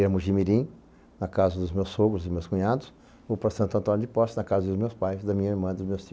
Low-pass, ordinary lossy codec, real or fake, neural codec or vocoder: none; none; real; none